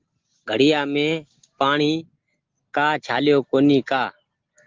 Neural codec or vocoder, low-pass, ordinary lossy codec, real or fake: none; 7.2 kHz; Opus, 16 kbps; real